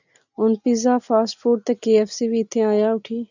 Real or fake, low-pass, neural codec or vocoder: real; 7.2 kHz; none